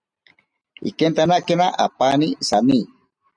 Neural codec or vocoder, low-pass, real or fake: none; 9.9 kHz; real